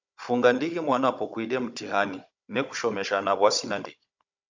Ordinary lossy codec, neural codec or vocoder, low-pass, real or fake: MP3, 64 kbps; codec, 16 kHz, 16 kbps, FunCodec, trained on Chinese and English, 50 frames a second; 7.2 kHz; fake